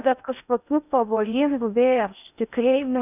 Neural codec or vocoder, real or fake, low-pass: codec, 16 kHz in and 24 kHz out, 0.6 kbps, FocalCodec, streaming, 2048 codes; fake; 3.6 kHz